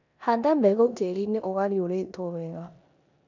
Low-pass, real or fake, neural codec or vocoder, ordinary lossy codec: 7.2 kHz; fake; codec, 16 kHz in and 24 kHz out, 0.9 kbps, LongCat-Audio-Codec, four codebook decoder; none